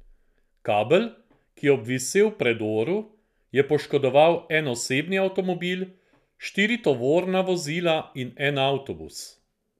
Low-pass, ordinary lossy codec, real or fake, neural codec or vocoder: 14.4 kHz; none; real; none